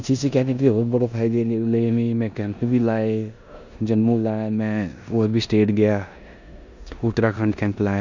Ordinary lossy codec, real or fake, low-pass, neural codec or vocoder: none; fake; 7.2 kHz; codec, 16 kHz in and 24 kHz out, 0.9 kbps, LongCat-Audio-Codec, four codebook decoder